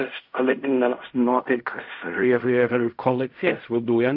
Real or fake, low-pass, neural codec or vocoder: fake; 5.4 kHz; codec, 16 kHz in and 24 kHz out, 0.4 kbps, LongCat-Audio-Codec, fine tuned four codebook decoder